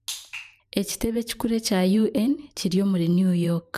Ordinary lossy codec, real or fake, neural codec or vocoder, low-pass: none; fake; vocoder, 44.1 kHz, 128 mel bands every 512 samples, BigVGAN v2; 14.4 kHz